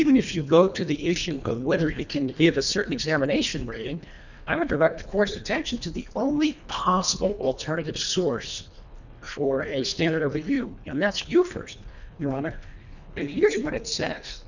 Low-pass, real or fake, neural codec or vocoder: 7.2 kHz; fake; codec, 24 kHz, 1.5 kbps, HILCodec